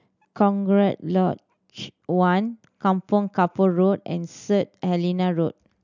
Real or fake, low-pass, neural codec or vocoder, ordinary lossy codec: real; 7.2 kHz; none; none